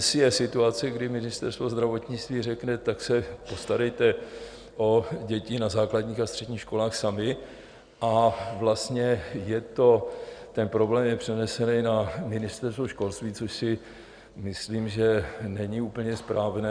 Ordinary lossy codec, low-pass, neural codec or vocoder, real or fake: Opus, 64 kbps; 9.9 kHz; none; real